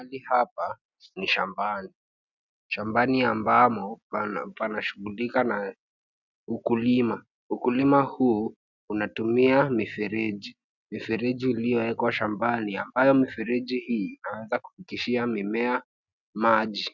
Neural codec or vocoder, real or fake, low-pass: none; real; 7.2 kHz